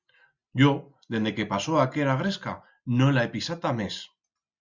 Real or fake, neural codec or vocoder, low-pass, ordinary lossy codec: real; none; 7.2 kHz; Opus, 64 kbps